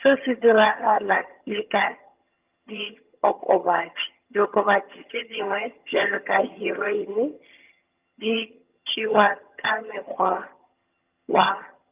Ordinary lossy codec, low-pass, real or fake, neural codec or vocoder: Opus, 32 kbps; 3.6 kHz; fake; vocoder, 22.05 kHz, 80 mel bands, HiFi-GAN